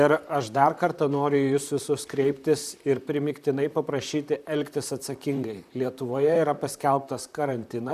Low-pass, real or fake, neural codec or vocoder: 14.4 kHz; fake; vocoder, 44.1 kHz, 128 mel bands, Pupu-Vocoder